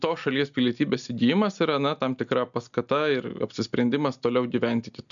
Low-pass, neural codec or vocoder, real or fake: 7.2 kHz; none; real